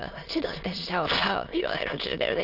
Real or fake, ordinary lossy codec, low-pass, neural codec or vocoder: fake; Opus, 32 kbps; 5.4 kHz; autoencoder, 22.05 kHz, a latent of 192 numbers a frame, VITS, trained on many speakers